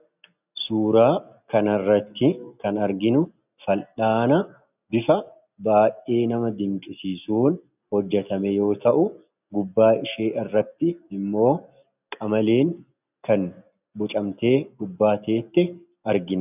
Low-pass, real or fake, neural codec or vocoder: 3.6 kHz; real; none